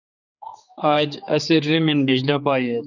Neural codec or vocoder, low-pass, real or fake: codec, 16 kHz, 4 kbps, X-Codec, HuBERT features, trained on general audio; 7.2 kHz; fake